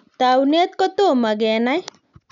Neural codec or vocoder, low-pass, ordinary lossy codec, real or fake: none; 7.2 kHz; none; real